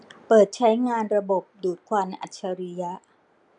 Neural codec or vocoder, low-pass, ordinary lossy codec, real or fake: none; 9.9 kHz; none; real